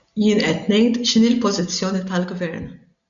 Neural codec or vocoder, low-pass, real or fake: none; 7.2 kHz; real